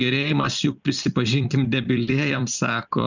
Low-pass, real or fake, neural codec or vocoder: 7.2 kHz; fake; vocoder, 44.1 kHz, 128 mel bands every 256 samples, BigVGAN v2